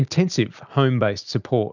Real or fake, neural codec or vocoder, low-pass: real; none; 7.2 kHz